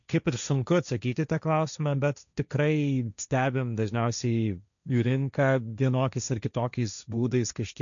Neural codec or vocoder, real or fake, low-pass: codec, 16 kHz, 1.1 kbps, Voila-Tokenizer; fake; 7.2 kHz